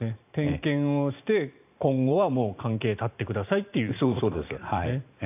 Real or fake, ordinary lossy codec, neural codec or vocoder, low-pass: fake; AAC, 32 kbps; vocoder, 44.1 kHz, 128 mel bands every 512 samples, BigVGAN v2; 3.6 kHz